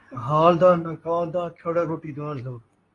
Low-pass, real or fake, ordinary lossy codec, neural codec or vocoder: 10.8 kHz; fake; AAC, 32 kbps; codec, 24 kHz, 0.9 kbps, WavTokenizer, medium speech release version 2